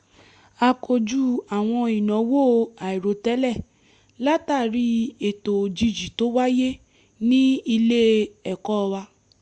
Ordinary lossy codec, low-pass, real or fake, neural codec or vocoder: Opus, 64 kbps; 10.8 kHz; real; none